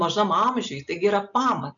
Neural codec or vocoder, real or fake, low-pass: none; real; 7.2 kHz